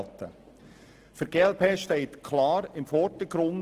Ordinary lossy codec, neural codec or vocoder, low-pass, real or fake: Opus, 16 kbps; none; 14.4 kHz; real